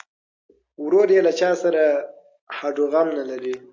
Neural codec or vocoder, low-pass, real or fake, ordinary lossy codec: none; 7.2 kHz; real; AAC, 48 kbps